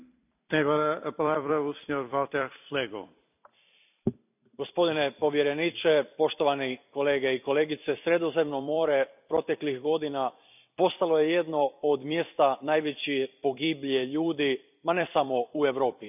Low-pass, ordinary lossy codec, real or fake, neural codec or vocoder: 3.6 kHz; none; fake; vocoder, 44.1 kHz, 128 mel bands every 256 samples, BigVGAN v2